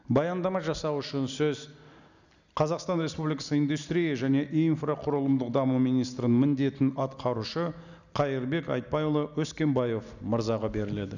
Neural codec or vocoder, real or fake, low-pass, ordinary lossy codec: none; real; 7.2 kHz; none